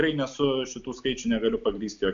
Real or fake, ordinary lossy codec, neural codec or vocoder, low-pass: real; MP3, 64 kbps; none; 7.2 kHz